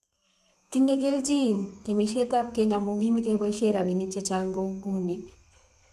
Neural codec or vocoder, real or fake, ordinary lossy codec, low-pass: codec, 32 kHz, 1.9 kbps, SNAC; fake; none; 14.4 kHz